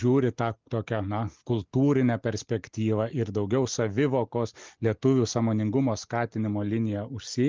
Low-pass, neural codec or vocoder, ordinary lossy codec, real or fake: 7.2 kHz; none; Opus, 24 kbps; real